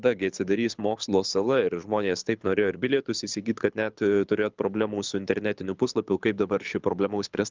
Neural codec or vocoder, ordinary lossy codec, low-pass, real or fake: codec, 24 kHz, 6 kbps, HILCodec; Opus, 32 kbps; 7.2 kHz; fake